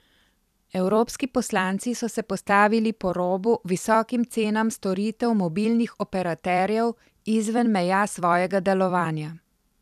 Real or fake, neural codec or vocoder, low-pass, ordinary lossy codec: fake; vocoder, 44.1 kHz, 128 mel bands every 256 samples, BigVGAN v2; 14.4 kHz; none